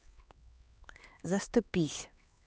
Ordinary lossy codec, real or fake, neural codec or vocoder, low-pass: none; fake; codec, 16 kHz, 2 kbps, X-Codec, HuBERT features, trained on LibriSpeech; none